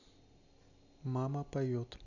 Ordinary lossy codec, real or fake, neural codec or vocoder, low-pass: none; real; none; 7.2 kHz